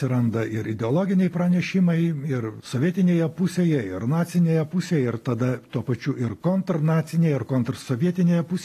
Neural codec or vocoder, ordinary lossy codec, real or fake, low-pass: none; AAC, 48 kbps; real; 14.4 kHz